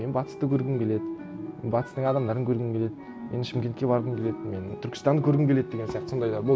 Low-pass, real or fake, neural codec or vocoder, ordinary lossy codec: none; real; none; none